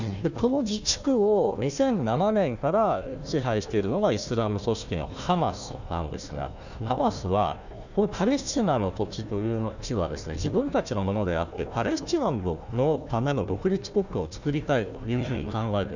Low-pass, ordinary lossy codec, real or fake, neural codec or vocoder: 7.2 kHz; none; fake; codec, 16 kHz, 1 kbps, FunCodec, trained on Chinese and English, 50 frames a second